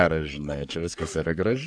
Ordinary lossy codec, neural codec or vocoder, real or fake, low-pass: AAC, 64 kbps; codec, 44.1 kHz, 3.4 kbps, Pupu-Codec; fake; 9.9 kHz